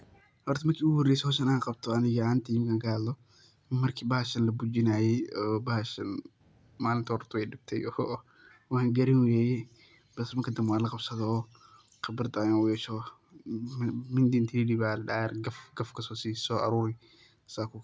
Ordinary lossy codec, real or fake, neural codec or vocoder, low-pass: none; real; none; none